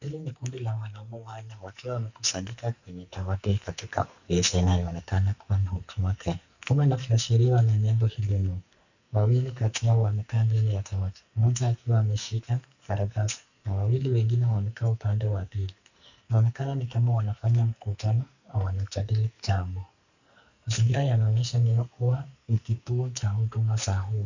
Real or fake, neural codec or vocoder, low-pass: fake; codec, 44.1 kHz, 2.6 kbps, SNAC; 7.2 kHz